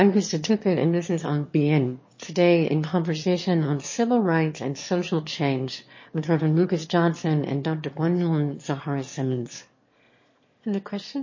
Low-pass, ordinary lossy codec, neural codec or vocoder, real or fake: 7.2 kHz; MP3, 32 kbps; autoencoder, 22.05 kHz, a latent of 192 numbers a frame, VITS, trained on one speaker; fake